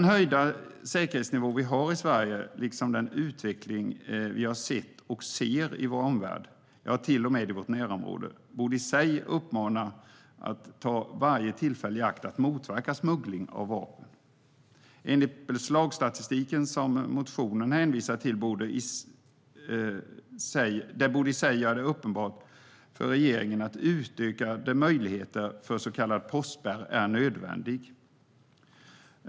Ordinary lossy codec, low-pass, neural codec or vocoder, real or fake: none; none; none; real